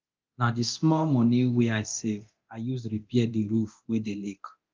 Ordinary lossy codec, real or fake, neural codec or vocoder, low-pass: Opus, 24 kbps; fake; codec, 24 kHz, 0.9 kbps, DualCodec; 7.2 kHz